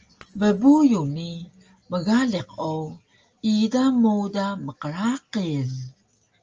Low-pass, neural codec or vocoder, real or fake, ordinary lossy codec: 7.2 kHz; none; real; Opus, 24 kbps